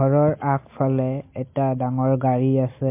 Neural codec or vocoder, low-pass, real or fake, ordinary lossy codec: none; 3.6 kHz; real; MP3, 24 kbps